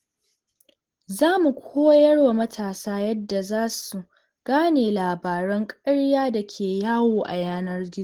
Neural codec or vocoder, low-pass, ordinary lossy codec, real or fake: none; 19.8 kHz; Opus, 16 kbps; real